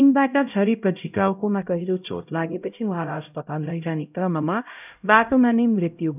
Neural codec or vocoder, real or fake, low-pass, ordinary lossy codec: codec, 16 kHz, 0.5 kbps, X-Codec, HuBERT features, trained on LibriSpeech; fake; 3.6 kHz; none